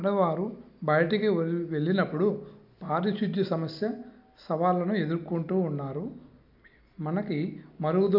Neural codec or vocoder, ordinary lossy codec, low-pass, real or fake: none; none; 5.4 kHz; real